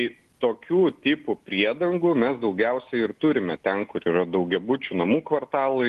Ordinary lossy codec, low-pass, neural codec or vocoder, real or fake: Opus, 24 kbps; 14.4 kHz; none; real